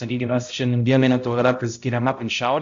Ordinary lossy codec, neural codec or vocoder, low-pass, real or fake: AAC, 64 kbps; codec, 16 kHz, 0.5 kbps, X-Codec, HuBERT features, trained on balanced general audio; 7.2 kHz; fake